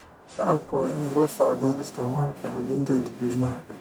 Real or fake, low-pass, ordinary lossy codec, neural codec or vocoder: fake; none; none; codec, 44.1 kHz, 0.9 kbps, DAC